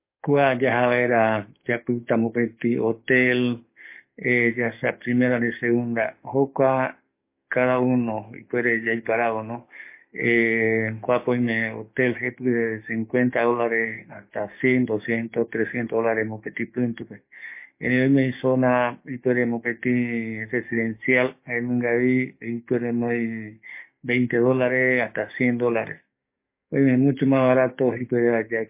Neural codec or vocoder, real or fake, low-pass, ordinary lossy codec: codec, 44.1 kHz, 7.8 kbps, DAC; fake; 3.6 kHz; MP3, 24 kbps